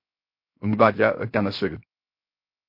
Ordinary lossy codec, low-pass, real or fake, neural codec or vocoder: MP3, 32 kbps; 5.4 kHz; fake; codec, 16 kHz, 0.7 kbps, FocalCodec